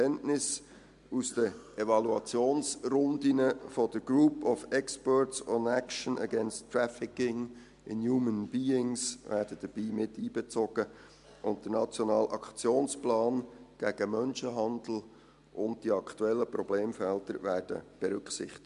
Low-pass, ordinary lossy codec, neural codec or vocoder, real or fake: 10.8 kHz; MP3, 64 kbps; none; real